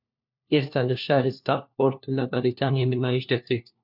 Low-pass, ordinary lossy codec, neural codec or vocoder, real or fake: 5.4 kHz; MP3, 48 kbps; codec, 16 kHz, 1 kbps, FunCodec, trained on LibriTTS, 50 frames a second; fake